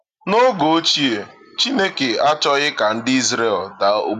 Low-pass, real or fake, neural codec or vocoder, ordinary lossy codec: 14.4 kHz; real; none; none